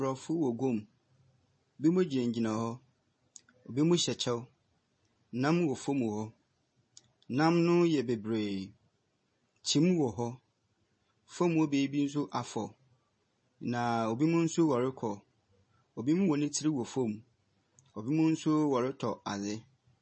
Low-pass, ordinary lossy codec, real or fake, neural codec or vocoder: 9.9 kHz; MP3, 32 kbps; real; none